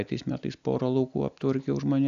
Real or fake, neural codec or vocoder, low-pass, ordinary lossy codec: real; none; 7.2 kHz; AAC, 96 kbps